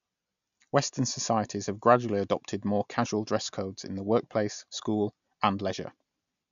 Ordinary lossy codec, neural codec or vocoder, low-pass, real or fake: none; none; 7.2 kHz; real